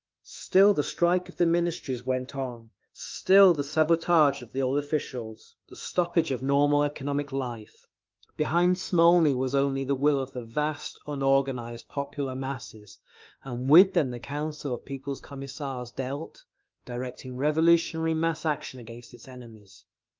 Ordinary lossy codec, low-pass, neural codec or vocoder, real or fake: Opus, 24 kbps; 7.2 kHz; autoencoder, 48 kHz, 32 numbers a frame, DAC-VAE, trained on Japanese speech; fake